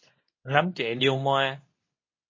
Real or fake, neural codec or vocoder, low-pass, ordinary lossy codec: fake; codec, 24 kHz, 0.9 kbps, WavTokenizer, medium speech release version 2; 7.2 kHz; MP3, 32 kbps